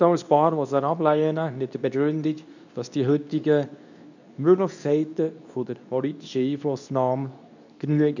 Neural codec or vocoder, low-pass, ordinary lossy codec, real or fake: codec, 24 kHz, 0.9 kbps, WavTokenizer, medium speech release version 2; 7.2 kHz; none; fake